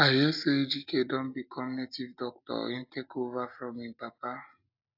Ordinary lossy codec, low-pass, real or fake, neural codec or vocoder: AAC, 48 kbps; 5.4 kHz; real; none